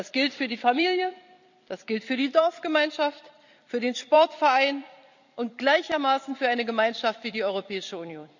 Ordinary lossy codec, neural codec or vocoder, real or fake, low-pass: none; vocoder, 44.1 kHz, 128 mel bands every 256 samples, BigVGAN v2; fake; 7.2 kHz